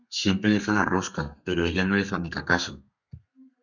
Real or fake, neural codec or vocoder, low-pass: fake; codec, 32 kHz, 1.9 kbps, SNAC; 7.2 kHz